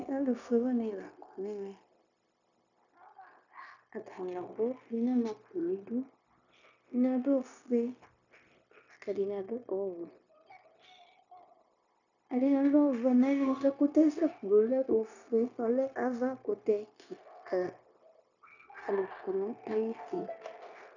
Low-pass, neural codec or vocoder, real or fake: 7.2 kHz; codec, 16 kHz, 0.9 kbps, LongCat-Audio-Codec; fake